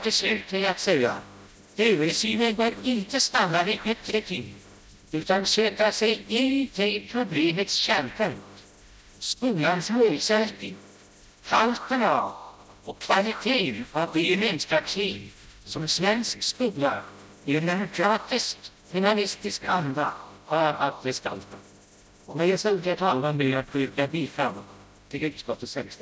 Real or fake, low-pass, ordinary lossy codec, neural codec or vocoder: fake; none; none; codec, 16 kHz, 0.5 kbps, FreqCodec, smaller model